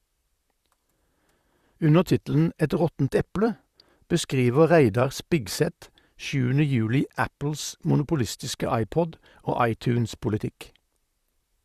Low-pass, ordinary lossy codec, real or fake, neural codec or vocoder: 14.4 kHz; Opus, 64 kbps; fake; vocoder, 44.1 kHz, 128 mel bands, Pupu-Vocoder